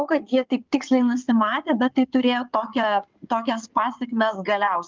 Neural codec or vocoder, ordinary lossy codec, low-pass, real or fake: codec, 16 kHz in and 24 kHz out, 2.2 kbps, FireRedTTS-2 codec; Opus, 32 kbps; 7.2 kHz; fake